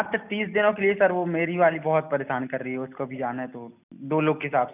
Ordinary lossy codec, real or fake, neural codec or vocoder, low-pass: none; real; none; 3.6 kHz